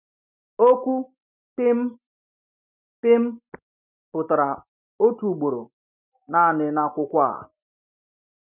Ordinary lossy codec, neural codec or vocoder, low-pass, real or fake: none; none; 3.6 kHz; real